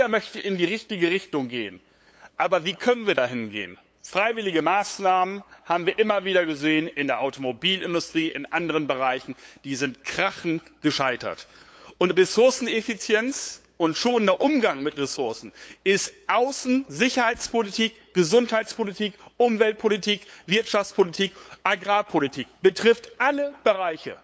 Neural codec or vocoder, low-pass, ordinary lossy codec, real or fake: codec, 16 kHz, 8 kbps, FunCodec, trained on LibriTTS, 25 frames a second; none; none; fake